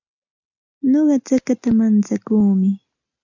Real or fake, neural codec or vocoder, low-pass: real; none; 7.2 kHz